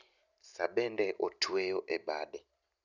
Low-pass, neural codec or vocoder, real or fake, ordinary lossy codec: 7.2 kHz; none; real; none